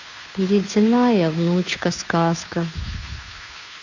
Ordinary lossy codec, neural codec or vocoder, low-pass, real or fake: none; codec, 16 kHz, 2 kbps, FunCodec, trained on Chinese and English, 25 frames a second; 7.2 kHz; fake